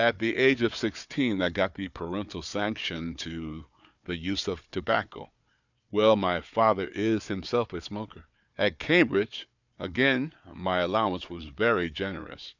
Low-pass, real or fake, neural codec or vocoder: 7.2 kHz; fake; codec, 16 kHz, 4 kbps, FunCodec, trained on Chinese and English, 50 frames a second